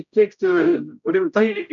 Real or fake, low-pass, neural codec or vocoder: fake; 7.2 kHz; codec, 16 kHz, 0.5 kbps, X-Codec, HuBERT features, trained on general audio